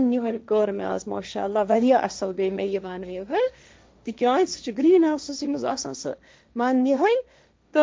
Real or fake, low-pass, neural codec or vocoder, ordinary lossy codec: fake; none; codec, 16 kHz, 1.1 kbps, Voila-Tokenizer; none